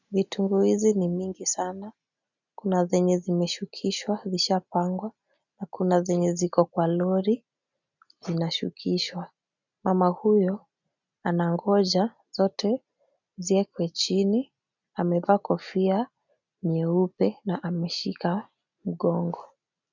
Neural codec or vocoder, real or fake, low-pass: none; real; 7.2 kHz